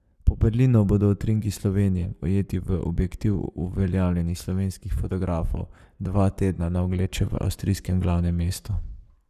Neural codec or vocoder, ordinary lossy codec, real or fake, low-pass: codec, 44.1 kHz, 7.8 kbps, Pupu-Codec; none; fake; 14.4 kHz